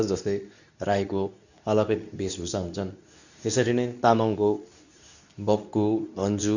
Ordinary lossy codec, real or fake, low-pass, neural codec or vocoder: none; fake; 7.2 kHz; codec, 24 kHz, 0.9 kbps, WavTokenizer, medium speech release version 2